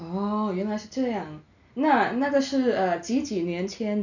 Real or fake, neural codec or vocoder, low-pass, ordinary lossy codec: real; none; 7.2 kHz; none